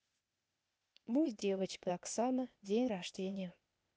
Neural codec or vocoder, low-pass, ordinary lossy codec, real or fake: codec, 16 kHz, 0.8 kbps, ZipCodec; none; none; fake